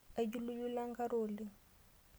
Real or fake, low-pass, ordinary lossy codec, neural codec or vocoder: real; none; none; none